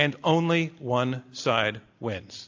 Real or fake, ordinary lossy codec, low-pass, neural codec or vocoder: real; AAC, 48 kbps; 7.2 kHz; none